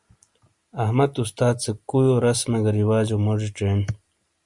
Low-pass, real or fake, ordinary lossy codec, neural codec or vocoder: 10.8 kHz; real; Opus, 64 kbps; none